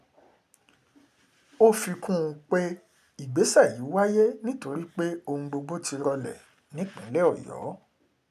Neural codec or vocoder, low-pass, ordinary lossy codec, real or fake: none; 14.4 kHz; none; real